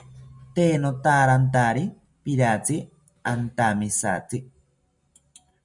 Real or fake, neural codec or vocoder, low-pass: real; none; 10.8 kHz